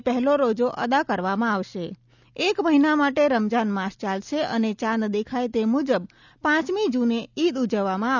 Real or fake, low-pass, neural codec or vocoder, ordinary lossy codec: real; 7.2 kHz; none; none